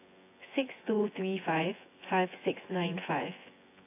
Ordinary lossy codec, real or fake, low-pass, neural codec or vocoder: AAC, 24 kbps; fake; 3.6 kHz; vocoder, 24 kHz, 100 mel bands, Vocos